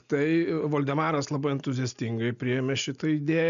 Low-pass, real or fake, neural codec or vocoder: 7.2 kHz; real; none